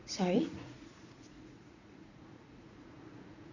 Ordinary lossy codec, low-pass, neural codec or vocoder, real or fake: none; 7.2 kHz; vocoder, 44.1 kHz, 80 mel bands, Vocos; fake